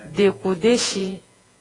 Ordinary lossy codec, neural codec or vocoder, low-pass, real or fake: AAC, 32 kbps; vocoder, 48 kHz, 128 mel bands, Vocos; 10.8 kHz; fake